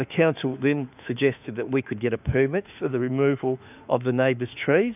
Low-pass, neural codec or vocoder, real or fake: 3.6 kHz; autoencoder, 48 kHz, 32 numbers a frame, DAC-VAE, trained on Japanese speech; fake